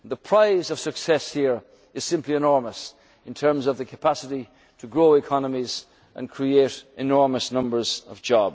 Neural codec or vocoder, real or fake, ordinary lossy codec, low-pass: none; real; none; none